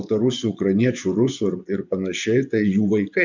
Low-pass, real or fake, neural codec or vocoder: 7.2 kHz; fake; vocoder, 24 kHz, 100 mel bands, Vocos